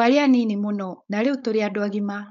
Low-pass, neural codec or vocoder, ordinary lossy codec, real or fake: 7.2 kHz; codec, 16 kHz, 4.8 kbps, FACodec; none; fake